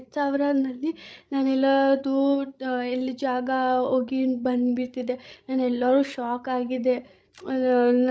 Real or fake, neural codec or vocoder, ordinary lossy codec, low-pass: fake; codec, 16 kHz, 16 kbps, FreqCodec, larger model; none; none